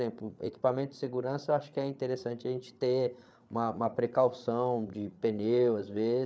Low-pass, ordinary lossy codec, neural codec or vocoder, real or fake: none; none; codec, 16 kHz, 8 kbps, FreqCodec, larger model; fake